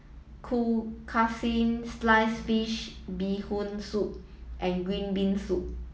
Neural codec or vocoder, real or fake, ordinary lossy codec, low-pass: none; real; none; none